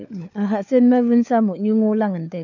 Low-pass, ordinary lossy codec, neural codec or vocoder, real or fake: 7.2 kHz; none; codec, 16 kHz, 4 kbps, FunCodec, trained on LibriTTS, 50 frames a second; fake